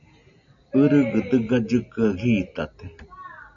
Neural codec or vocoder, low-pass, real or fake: none; 7.2 kHz; real